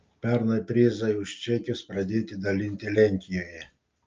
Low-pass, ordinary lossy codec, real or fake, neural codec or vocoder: 7.2 kHz; Opus, 24 kbps; real; none